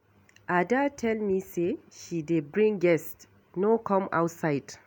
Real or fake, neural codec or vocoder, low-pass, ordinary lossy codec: real; none; none; none